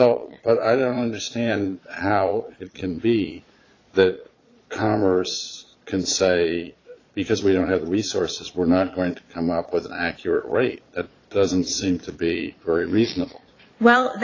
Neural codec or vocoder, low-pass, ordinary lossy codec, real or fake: vocoder, 22.05 kHz, 80 mel bands, Vocos; 7.2 kHz; AAC, 32 kbps; fake